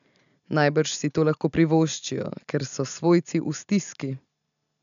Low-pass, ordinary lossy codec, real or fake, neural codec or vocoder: 7.2 kHz; none; real; none